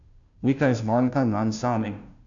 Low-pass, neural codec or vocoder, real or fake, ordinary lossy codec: 7.2 kHz; codec, 16 kHz, 0.5 kbps, FunCodec, trained on Chinese and English, 25 frames a second; fake; none